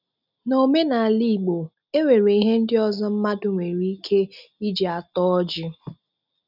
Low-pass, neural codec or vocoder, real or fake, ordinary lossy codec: 5.4 kHz; none; real; none